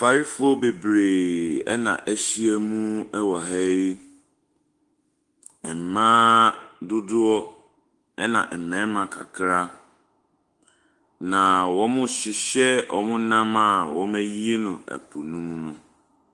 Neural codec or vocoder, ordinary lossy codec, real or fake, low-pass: autoencoder, 48 kHz, 32 numbers a frame, DAC-VAE, trained on Japanese speech; Opus, 24 kbps; fake; 10.8 kHz